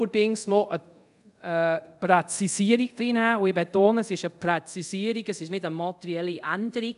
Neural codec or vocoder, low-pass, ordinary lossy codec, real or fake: codec, 24 kHz, 0.5 kbps, DualCodec; 10.8 kHz; none; fake